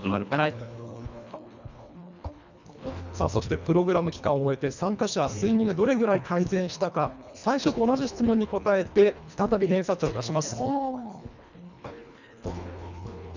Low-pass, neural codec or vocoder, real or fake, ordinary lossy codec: 7.2 kHz; codec, 24 kHz, 1.5 kbps, HILCodec; fake; none